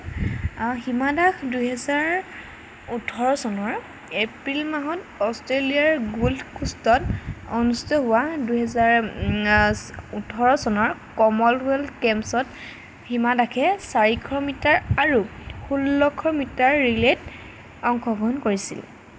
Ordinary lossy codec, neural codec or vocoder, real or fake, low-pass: none; none; real; none